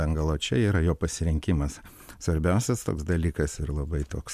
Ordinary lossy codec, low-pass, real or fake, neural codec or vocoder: MP3, 96 kbps; 14.4 kHz; real; none